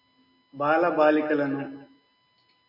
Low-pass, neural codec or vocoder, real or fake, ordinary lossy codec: 5.4 kHz; none; real; AAC, 48 kbps